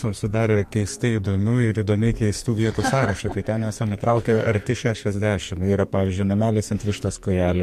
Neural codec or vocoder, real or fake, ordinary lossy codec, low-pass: codec, 44.1 kHz, 2.6 kbps, SNAC; fake; MP3, 64 kbps; 14.4 kHz